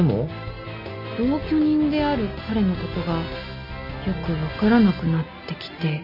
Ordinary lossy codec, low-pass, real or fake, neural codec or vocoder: none; 5.4 kHz; real; none